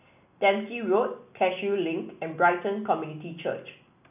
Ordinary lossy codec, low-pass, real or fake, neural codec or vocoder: none; 3.6 kHz; real; none